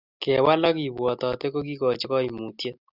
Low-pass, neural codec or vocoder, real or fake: 5.4 kHz; none; real